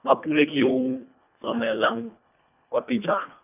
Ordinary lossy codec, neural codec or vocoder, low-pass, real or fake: none; codec, 24 kHz, 1.5 kbps, HILCodec; 3.6 kHz; fake